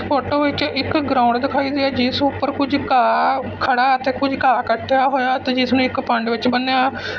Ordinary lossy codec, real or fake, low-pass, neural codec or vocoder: none; real; none; none